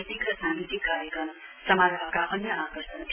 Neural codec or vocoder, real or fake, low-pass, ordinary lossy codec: none; real; 3.6 kHz; none